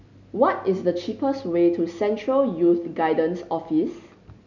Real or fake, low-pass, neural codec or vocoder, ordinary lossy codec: real; 7.2 kHz; none; none